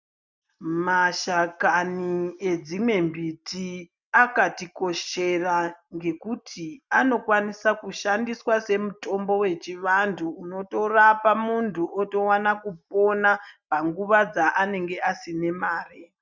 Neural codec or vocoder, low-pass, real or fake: none; 7.2 kHz; real